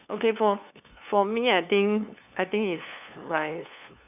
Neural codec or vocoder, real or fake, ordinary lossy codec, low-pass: codec, 16 kHz, 2 kbps, FunCodec, trained on LibriTTS, 25 frames a second; fake; none; 3.6 kHz